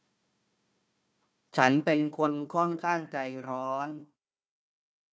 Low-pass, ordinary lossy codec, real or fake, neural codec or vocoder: none; none; fake; codec, 16 kHz, 1 kbps, FunCodec, trained on Chinese and English, 50 frames a second